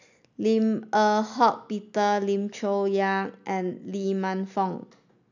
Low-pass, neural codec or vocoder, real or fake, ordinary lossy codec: 7.2 kHz; none; real; none